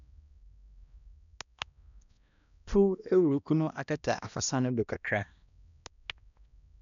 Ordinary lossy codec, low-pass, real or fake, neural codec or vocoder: none; 7.2 kHz; fake; codec, 16 kHz, 1 kbps, X-Codec, HuBERT features, trained on balanced general audio